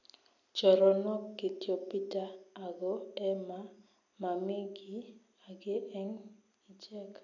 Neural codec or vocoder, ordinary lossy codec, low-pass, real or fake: none; none; 7.2 kHz; real